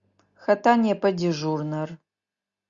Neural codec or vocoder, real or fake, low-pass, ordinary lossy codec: none; real; 7.2 kHz; Opus, 64 kbps